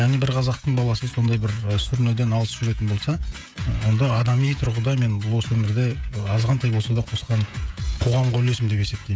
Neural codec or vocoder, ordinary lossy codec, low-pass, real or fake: codec, 16 kHz, 16 kbps, FreqCodec, smaller model; none; none; fake